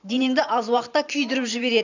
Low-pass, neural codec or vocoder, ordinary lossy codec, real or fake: 7.2 kHz; vocoder, 44.1 kHz, 128 mel bands every 512 samples, BigVGAN v2; none; fake